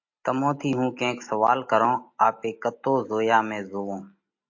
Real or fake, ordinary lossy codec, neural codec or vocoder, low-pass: real; MP3, 48 kbps; none; 7.2 kHz